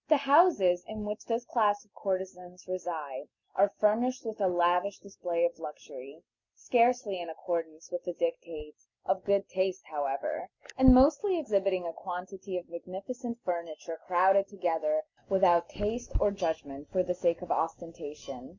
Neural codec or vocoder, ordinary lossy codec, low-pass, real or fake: none; Opus, 64 kbps; 7.2 kHz; real